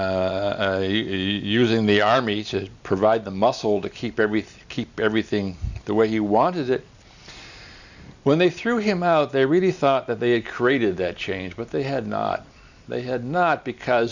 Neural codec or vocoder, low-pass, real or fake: none; 7.2 kHz; real